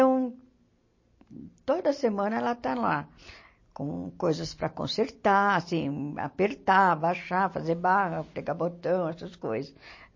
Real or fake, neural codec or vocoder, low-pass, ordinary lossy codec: real; none; 7.2 kHz; MP3, 32 kbps